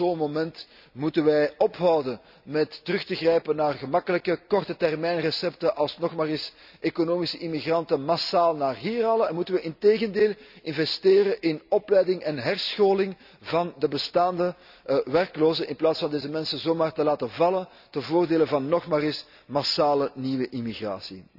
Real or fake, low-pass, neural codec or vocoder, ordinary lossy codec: real; 5.4 kHz; none; none